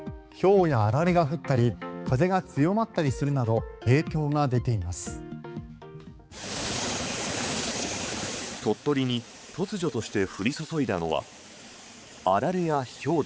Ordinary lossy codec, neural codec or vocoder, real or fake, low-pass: none; codec, 16 kHz, 4 kbps, X-Codec, HuBERT features, trained on balanced general audio; fake; none